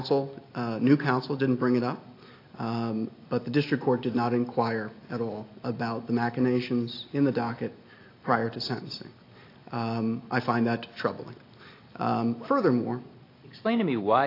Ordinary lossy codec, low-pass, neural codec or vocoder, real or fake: AAC, 32 kbps; 5.4 kHz; none; real